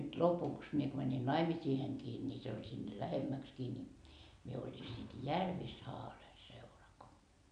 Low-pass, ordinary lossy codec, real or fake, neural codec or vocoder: 9.9 kHz; none; real; none